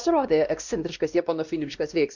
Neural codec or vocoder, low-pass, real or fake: codec, 16 kHz, 1 kbps, X-Codec, WavLM features, trained on Multilingual LibriSpeech; 7.2 kHz; fake